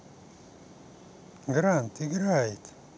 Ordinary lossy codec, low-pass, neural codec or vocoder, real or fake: none; none; none; real